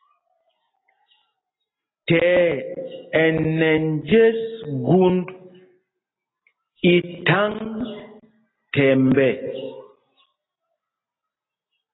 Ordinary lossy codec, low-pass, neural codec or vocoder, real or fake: AAC, 16 kbps; 7.2 kHz; none; real